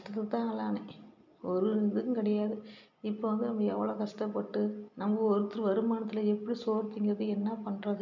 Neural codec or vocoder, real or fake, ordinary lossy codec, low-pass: none; real; MP3, 64 kbps; 7.2 kHz